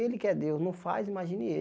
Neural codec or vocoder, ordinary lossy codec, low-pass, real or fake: none; none; none; real